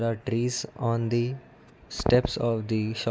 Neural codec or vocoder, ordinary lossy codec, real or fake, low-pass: none; none; real; none